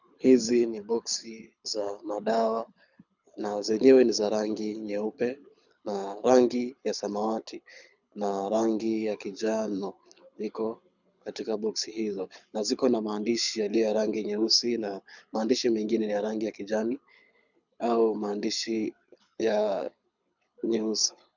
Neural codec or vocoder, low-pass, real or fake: codec, 24 kHz, 6 kbps, HILCodec; 7.2 kHz; fake